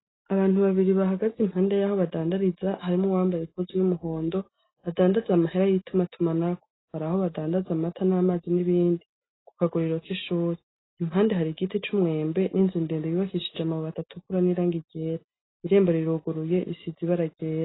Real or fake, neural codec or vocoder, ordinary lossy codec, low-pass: real; none; AAC, 16 kbps; 7.2 kHz